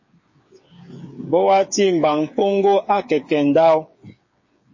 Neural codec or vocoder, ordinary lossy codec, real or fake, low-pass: codec, 16 kHz, 8 kbps, FreqCodec, smaller model; MP3, 32 kbps; fake; 7.2 kHz